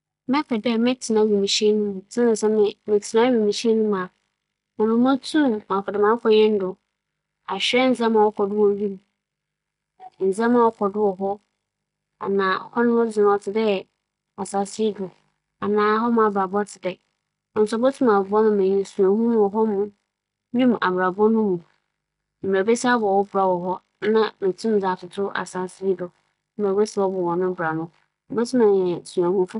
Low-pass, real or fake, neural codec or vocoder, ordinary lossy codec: 10.8 kHz; real; none; MP3, 64 kbps